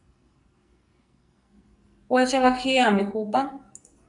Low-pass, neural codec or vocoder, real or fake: 10.8 kHz; codec, 44.1 kHz, 2.6 kbps, SNAC; fake